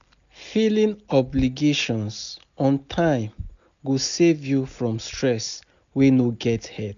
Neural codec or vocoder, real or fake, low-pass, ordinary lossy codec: none; real; 7.2 kHz; MP3, 96 kbps